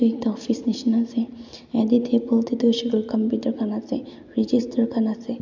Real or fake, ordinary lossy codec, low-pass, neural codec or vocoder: real; none; 7.2 kHz; none